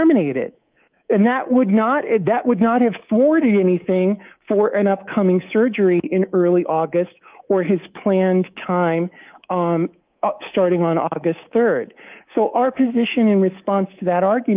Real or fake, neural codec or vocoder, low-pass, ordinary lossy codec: fake; codec, 24 kHz, 3.1 kbps, DualCodec; 3.6 kHz; Opus, 24 kbps